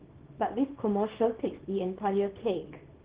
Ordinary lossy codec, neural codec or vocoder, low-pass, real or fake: Opus, 16 kbps; codec, 24 kHz, 0.9 kbps, WavTokenizer, small release; 3.6 kHz; fake